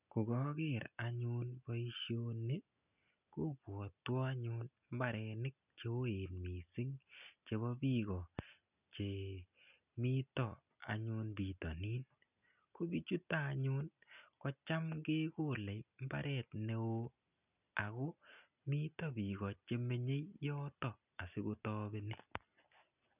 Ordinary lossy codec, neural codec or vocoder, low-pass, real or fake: none; none; 3.6 kHz; real